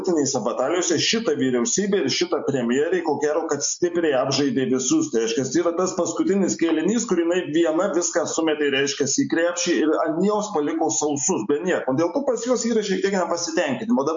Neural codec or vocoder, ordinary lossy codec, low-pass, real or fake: none; MP3, 48 kbps; 7.2 kHz; real